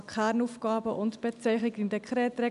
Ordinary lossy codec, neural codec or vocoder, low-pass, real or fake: none; none; 10.8 kHz; real